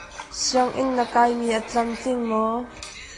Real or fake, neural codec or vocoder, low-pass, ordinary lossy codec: real; none; 10.8 kHz; AAC, 32 kbps